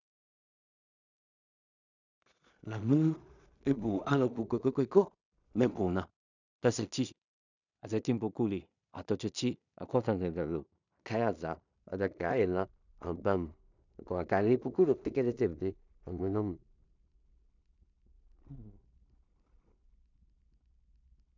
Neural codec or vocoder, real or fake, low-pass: codec, 16 kHz in and 24 kHz out, 0.4 kbps, LongCat-Audio-Codec, two codebook decoder; fake; 7.2 kHz